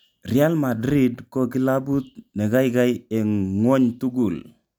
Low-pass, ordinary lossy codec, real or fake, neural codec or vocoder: none; none; real; none